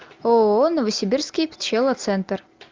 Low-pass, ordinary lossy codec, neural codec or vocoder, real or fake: 7.2 kHz; Opus, 32 kbps; none; real